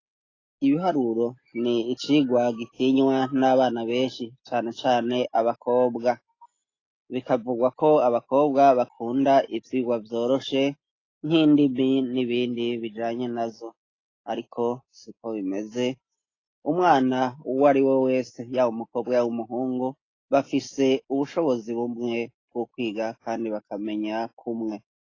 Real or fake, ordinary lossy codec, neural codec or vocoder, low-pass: real; AAC, 32 kbps; none; 7.2 kHz